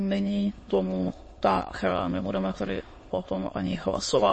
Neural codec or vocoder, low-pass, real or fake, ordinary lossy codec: autoencoder, 22.05 kHz, a latent of 192 numbers a frame, VITS, trained on many speakers; 9.9 kHz; fake; MP3, 32 kbps